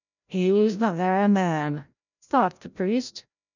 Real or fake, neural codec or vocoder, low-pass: fake; codec, 16 kHz, 0.5 kbps, FreqCodec, larger model; 7.2 kHz